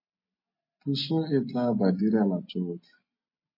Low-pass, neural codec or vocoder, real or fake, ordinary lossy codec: 5.4 kHz; none; real; MP3, 24 kbps